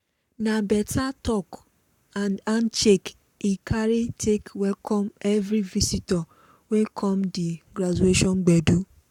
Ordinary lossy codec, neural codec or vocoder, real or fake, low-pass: none; codec, 44.1 kHz, 7.8 kbps, Pupu-Codec; fake; 19.8 kHz